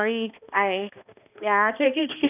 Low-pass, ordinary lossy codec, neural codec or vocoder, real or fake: 3.6 kHz; none; codec, 16 kHz, 1 kbps, X-Codec, HuBERT features, trained on balanced general audio; fake